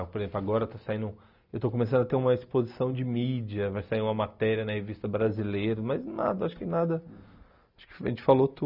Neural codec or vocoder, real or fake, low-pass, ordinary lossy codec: none; real; 5.4 kHz; none